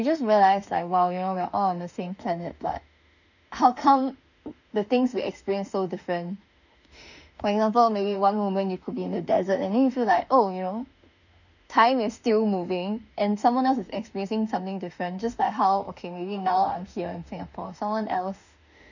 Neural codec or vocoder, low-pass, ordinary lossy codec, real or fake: autoencoder, 48 kHz, 32 numbers a frame, DAC-VAE, trained on Japanese speech; 7.2 kHz; none; fake